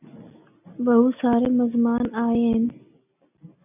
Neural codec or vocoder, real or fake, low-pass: none; real; 3.6 kHz